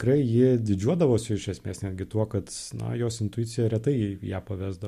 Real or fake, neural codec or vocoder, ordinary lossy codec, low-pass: real; none; MP3, 64 kbps; 14.4 kHz